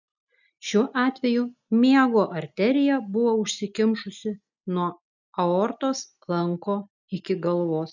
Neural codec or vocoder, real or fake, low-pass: none; real; 7.2 kHz